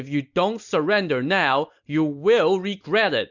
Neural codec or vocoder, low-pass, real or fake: none; 7.2 kHz; real